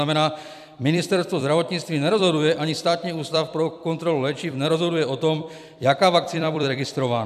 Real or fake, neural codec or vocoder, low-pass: fake; vocoder, 44.1 kHz, 128 mel bands every 256 samples, BigVGAN v2; 14.4 kHz